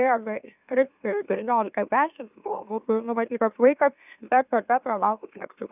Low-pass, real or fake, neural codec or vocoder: 3.6 kHz; fake; autoencoder, 44.1 kHz, a latent of 192 numbers a frame, MeloTTS